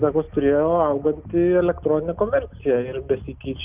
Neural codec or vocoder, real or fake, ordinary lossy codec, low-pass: none; real; Opus, 32 kbps; 3.6 kHz